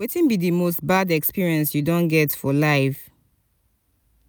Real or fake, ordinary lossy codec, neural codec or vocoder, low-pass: real; none; none; none